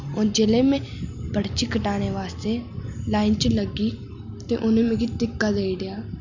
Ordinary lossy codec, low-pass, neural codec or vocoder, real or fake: none; 7.2 kHz; none; real